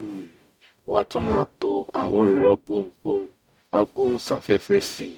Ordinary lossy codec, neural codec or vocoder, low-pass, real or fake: none; codec, 44.1 kHz, 0.9 kbps, DAC; 19.8 kHz; fake